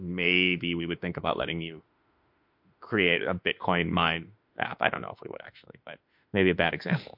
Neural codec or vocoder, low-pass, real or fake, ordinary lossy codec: autoencoder, 48 kHz, 32 numbers a frame, DAC-VAE, trained on Japanese speech; 5.4 kHz; fake; MP3, 48 kbps